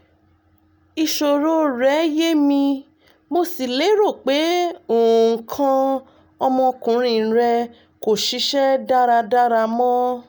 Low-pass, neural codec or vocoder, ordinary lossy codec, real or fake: none; none; none; real